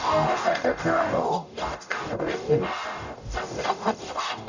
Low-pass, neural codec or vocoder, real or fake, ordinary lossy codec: 7.2 kHz; codec, 44.1 kHz, 0.9 kbps, DAC; fake; none